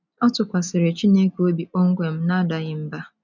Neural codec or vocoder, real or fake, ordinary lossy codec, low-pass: none; real; none; 7.2 kHz